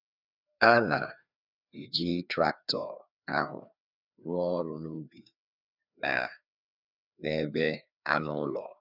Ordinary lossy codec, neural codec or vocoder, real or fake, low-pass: none; codec, 16 kHz, 2 kbps, FreqCodec, larger model; fake; 5.4 kHz